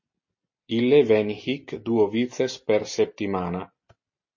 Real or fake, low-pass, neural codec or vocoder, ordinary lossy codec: real; 7.2 kHz; none; MP3, 32 kbps